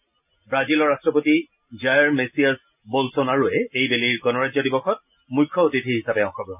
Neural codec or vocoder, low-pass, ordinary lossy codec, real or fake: none; 3.6 kHz; none; real